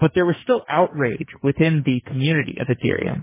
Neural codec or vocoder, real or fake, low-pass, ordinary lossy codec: codec, 44.1 kHz, 2.6 kbps, SNAC; fake; 3.6 kHz; MP3, 16 kbps